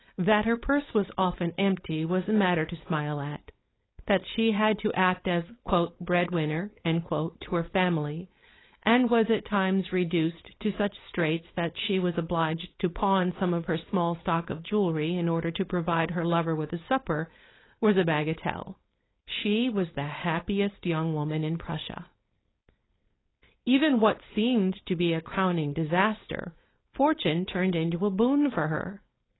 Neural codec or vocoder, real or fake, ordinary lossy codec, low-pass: codec, 16 kHz, 4.8 kbps, FACodec; fake; AAC, 16 kbps; 7.2 kHz